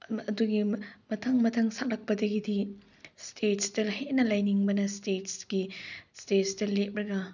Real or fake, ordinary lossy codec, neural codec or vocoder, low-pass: real; none; none; 7.2 kHz